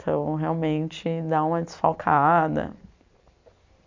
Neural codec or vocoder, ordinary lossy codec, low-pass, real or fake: vocoder, 44.1 kHz, 128 mel bands every 256 samples, BigVGAN v2; MP3, 64 kbps; 7.2 kHz; fake